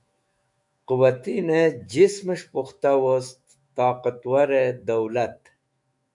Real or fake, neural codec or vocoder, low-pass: fake; autoencoder, 48 kHz, 128 numbers a frame, DAC-VAE, trained on Japanese speech; 10.8 kHz